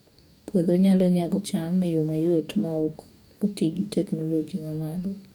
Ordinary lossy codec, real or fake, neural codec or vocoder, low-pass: none; fake; codec, 44.1 kHz, 2.6 kbps, DAC; 19.8 kHz